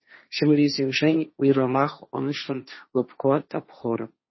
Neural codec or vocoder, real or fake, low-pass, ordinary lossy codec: codec, 16 kHz, 1.1 kbps, Voila-Tokenizer; fake; 7.2 kHz; MP3, 24 kbps